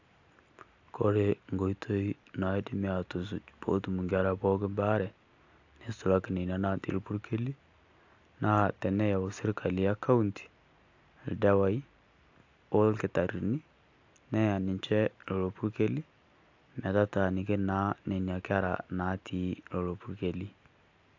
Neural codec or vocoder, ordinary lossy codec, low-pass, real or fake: none; AAC, 48 kbps; 7.2 kHz; real